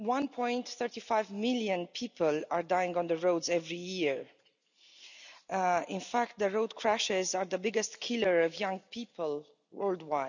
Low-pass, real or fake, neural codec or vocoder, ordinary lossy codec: 7.2 kHz; real; none; none